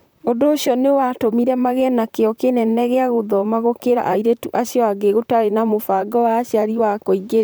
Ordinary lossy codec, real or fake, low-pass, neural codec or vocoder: none; fake; none; vocoder, 44.1 kHz, 128 mel bands, Pupu-Vocoder